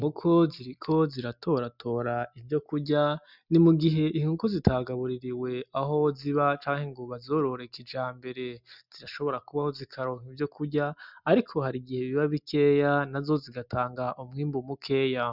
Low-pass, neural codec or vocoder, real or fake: 5.4 kHz; none; real